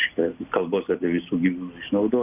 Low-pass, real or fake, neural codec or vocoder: 3.6 kHz; real; none